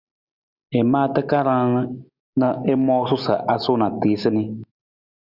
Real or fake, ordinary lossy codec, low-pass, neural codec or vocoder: real; Opus, 64 kbps; 5.4 kHz; none